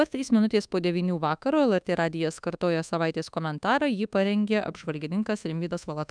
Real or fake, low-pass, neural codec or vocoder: fake; 9.9 kHz; codec, 24 kHz, 1.2 kbps, DualCodec